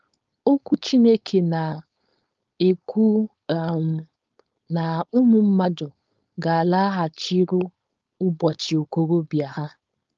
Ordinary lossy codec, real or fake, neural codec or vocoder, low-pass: Opus, 24 kbps; fake; codec, 16 kHz, 4.8 kbps, FACodec; 7.2 kHz